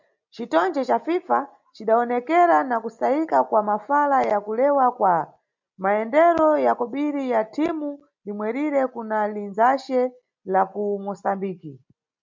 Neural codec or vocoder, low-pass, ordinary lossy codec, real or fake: none; 7.2 kHz; MP3, 64 kbps; real